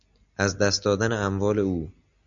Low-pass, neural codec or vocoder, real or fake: 7.2 kHz; none; real